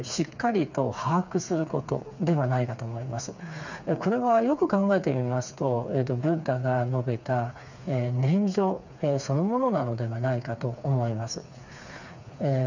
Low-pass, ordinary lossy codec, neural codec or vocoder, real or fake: 7.2 kHz; none; codec, 16 kHz, 4 kbps, FreqCodec, smaller model; fake